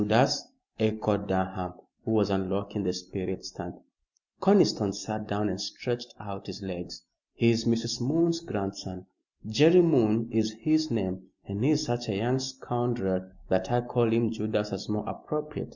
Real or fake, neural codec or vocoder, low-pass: real; none; 7.2 kHz